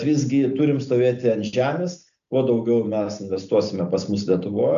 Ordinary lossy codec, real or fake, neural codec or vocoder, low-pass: AAC, 96 kbps; real; none; 7.2 kHz